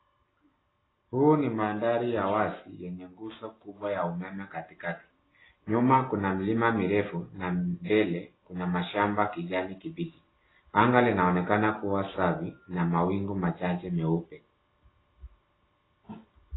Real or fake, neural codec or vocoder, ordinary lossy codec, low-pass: real; none; AAC, 16 kbps; 7.2 kHz